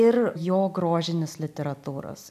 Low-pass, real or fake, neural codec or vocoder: 14.4 kHz; real; none